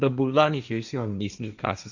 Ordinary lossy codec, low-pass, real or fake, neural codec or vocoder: none; 7.2 kHz; fake; codec, 16 kHz, 1.1 kbps, Voila-Tokenizer